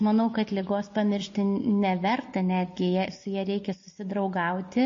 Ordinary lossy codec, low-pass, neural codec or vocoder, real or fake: MP3, 32 kbps; 7.2 kHz; none; real